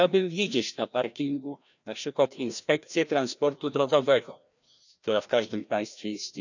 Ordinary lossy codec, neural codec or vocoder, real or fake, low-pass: none; codec, 16 kHz, 1 kbps, FreqCodec, larger model; fake; 7.2 kHz